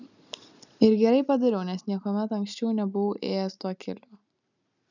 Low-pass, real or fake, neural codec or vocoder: 7.2 kHz; real; none